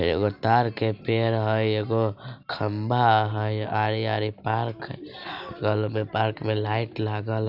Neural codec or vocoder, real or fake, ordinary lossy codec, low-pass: none; real; none; 5.4 kHz